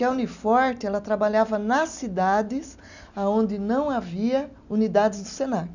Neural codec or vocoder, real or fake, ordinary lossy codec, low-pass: none; real; none; 7.2 kHz